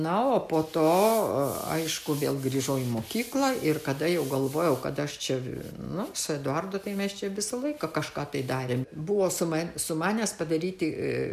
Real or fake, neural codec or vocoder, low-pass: real; none; 14.4 kHz